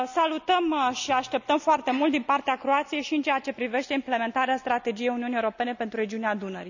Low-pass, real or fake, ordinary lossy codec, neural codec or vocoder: 7.2 kHz; real; none; none